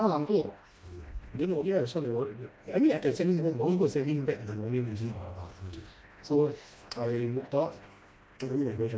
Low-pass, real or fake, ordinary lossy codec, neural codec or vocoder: none; fake; none; codec, 16 kHz, 1 kbps, FreqCodec, smaller model